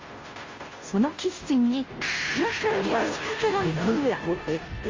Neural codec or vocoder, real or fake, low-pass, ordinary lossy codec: codec, 16 kHz, 0.5 kbps, FunCodec, trained on Chinese and English, 25 frames a second; fake; 7.2 kHz; Opus, 32 kbps